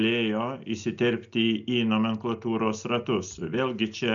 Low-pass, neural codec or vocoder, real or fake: 7.2 kHz; none; real